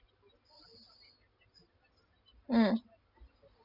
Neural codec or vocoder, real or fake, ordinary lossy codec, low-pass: none; real; none; 5.4 kHz